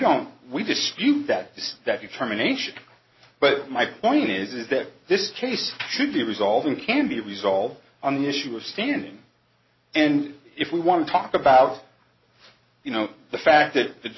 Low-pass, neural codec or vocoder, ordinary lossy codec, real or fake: 7.2 kHz; none; MP3, 24 kbps; real